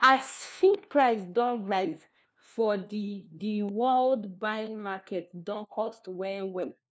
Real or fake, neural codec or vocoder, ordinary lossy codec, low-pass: fake; codec, 16 kHz, 1 kbps, FunCodec, trained on LibriTTS, 50 frames a second; none; none